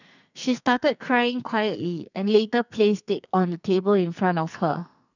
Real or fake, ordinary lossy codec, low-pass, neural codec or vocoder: fake; none; 7.2 kHz; codec, 44.1 kHz, 2.6 kbps, SNAC